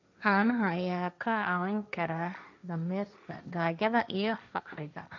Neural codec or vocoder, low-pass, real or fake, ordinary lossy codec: codec, 16 kHz, 1.1 kbps, Voila-Tokenizer; 7.2 kHz; fake; none